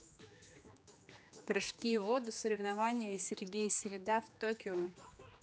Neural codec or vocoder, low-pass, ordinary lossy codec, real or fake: codec, 16 kHz, 2 kbps, X-Codec, HuBERT features, trained on general audio; none; none; fake